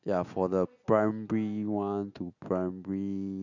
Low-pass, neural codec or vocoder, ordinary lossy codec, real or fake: 7.2 kHz; none; none; real